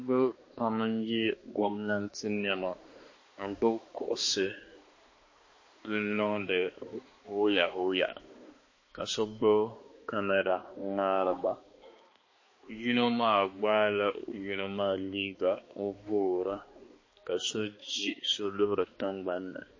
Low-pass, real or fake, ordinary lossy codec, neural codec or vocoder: 7.2 kHz; fake; MP3, 32 kbps; codec, 16 kHz, 2 kbps, X-Codec, HuBERT features, trained on balanced general audio